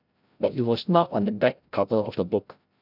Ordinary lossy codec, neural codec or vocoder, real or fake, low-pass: none; codec, 16 kHz, 0.5 kbps, FreqCodec, larger model; fake; 5.4 kHz